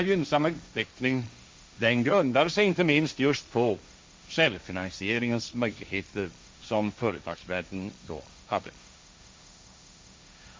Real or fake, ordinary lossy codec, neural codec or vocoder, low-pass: fake; none; codec, 16 kHz, 1.1 kbps, Voila-Tokenizer; none